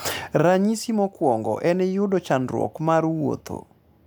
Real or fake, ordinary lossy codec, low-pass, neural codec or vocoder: real; none; none; none